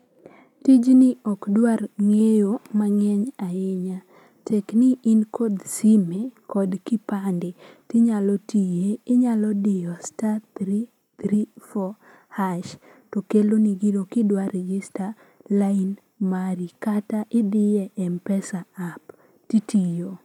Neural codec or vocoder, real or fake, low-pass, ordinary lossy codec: none; real; 19.8 kHz; none